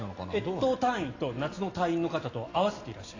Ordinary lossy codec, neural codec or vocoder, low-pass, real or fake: AAC, 32 kbps; none; 7.2 kHz; real